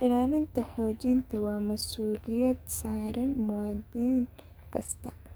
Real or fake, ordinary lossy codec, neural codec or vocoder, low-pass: fake; none; codec, 44.1 kHz, 2.6 kbps, SNAC; none